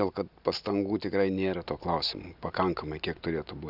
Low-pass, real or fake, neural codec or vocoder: 5.4 kHz; real; none